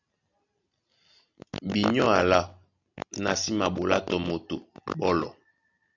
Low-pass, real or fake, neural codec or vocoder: 7.2 kHz; real; none